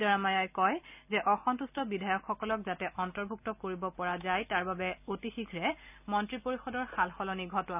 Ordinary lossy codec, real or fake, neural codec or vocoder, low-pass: none; real; none; 3.6 kHz